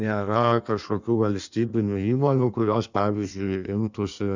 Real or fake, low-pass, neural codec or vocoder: fake; 7.2 kHz; codec, 16 kHz in and 24 kHz out, 1.1 kbps, FireRedTTS-2 codec